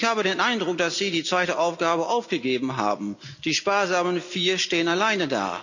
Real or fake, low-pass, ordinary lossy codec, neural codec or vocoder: real; 7.2 kHz; none; none